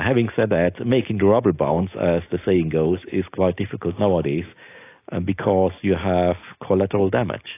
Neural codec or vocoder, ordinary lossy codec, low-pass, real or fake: none; AAC, 24 kbps; 3.6 kHz; real